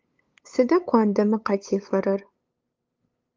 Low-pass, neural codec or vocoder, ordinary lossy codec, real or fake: 7.2 kHz; codec, 16 kHz, 8 kbps, FunCodec, trained on LibriTTS, 25 frames a second; Opus, 24 kbps; fake